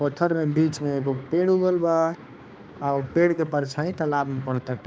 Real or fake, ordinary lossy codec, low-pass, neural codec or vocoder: fake; none; none; codec, 16 kHz, 2 kbps, X-Codec, HuBERT features, trained on balanced general audio